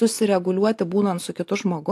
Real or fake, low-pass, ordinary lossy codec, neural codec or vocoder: real; 14.4 kHz; AAC, 64 kbps; none